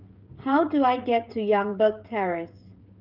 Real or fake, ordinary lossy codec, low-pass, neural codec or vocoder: fake; Opus, 24 kbps; 5.4 kHz; codec, 16 kHz, 16 kbps, FreqCodec, smaller model